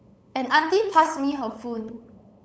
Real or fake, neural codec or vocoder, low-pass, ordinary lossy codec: fake; codec, 16 kHz, 8 kbps, FunCodec, trained on LibriTTS, 25 frames a second; none; none